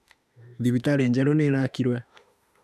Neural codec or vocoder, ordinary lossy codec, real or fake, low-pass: autoencoder, 48 kHz, 32 numbers a frame, DAC-VAE, trained on Japanese speech; none; fake; 14.4 kHz